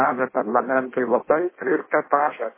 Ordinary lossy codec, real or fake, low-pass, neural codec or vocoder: MP3, 16 kbps; fake; 3.6 kHz; codec, 16 kHz in and 24 kHz out, 0.6 kbps, FireRedTTS-2 codec